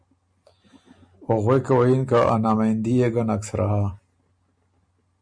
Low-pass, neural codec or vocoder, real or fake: 9.9 kHz; none; real